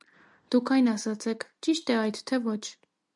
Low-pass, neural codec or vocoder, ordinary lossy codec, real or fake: 10.8 kHz; none; MP3, 96 kbps; real